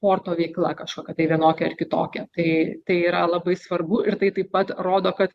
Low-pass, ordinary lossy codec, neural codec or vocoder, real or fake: 14.4 kHz; Opus, 64 kbps; none; real